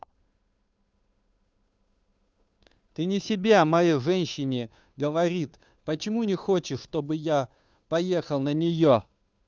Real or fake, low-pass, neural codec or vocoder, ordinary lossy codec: fake; none; codec, 16 kHz, 2 kbps, FunCodec, trained on Chinese and English, 25 frames a second; none